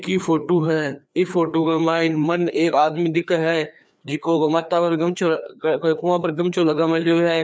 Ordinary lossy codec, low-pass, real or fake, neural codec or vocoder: none; none; fake; codec, 16 kHz, 2 kbps, FreqCodec, larger model